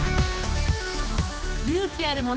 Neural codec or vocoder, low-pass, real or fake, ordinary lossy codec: codec, 16 kHz, 2 kbps, X-Codec, HuBERT features, trained on general audio; none; fake; none